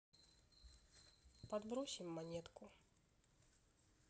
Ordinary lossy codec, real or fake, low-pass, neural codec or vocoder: none; real; none; none